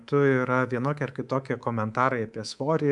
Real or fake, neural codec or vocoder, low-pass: fake; codec, 24 kHz, 3.1 kbps, DualCodec; 10.8 kHz